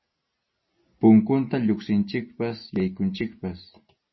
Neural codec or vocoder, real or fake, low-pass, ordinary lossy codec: none; real; 7.2 kHz; MP3, 24 kbps